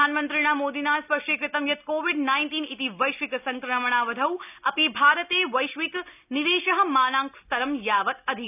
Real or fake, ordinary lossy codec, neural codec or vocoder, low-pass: real; none; none; 3.6 kHz